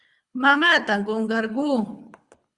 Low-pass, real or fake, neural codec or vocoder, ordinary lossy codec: 10.8 kHz; fake; codec, 24 kHz, 3 kbps, HILCodec; Opus, 64 kbps